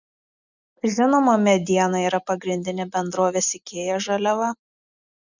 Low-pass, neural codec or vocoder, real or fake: 7.2 kHz; none; real